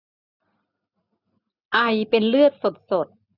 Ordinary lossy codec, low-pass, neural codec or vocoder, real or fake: none; 5.4 kHz; none; real